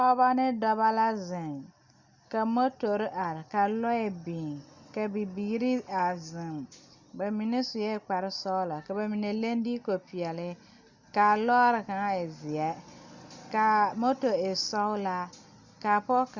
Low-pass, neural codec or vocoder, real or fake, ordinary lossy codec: 7.2 kHz; none; real; Opus, 64 kbps